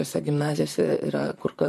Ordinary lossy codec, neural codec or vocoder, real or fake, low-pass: MP3, 64 kbps; vocoder, 44.1 kHz, 128 mel bands, Pupu-Vocoder; fake; 14.4 kHz